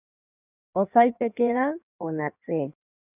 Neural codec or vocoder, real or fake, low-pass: codec, 16 kHz in and 24 kHz out, 1.1 kbps, FireRedTTS-2 codec; fake; 3.6 kHz